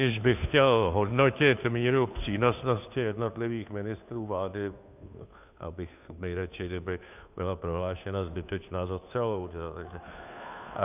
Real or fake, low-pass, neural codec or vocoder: fake; 3.6 kHz; codec, 16 kHz, 2 kbps, FunCodec, trained on Chinese and English, 25 frames a second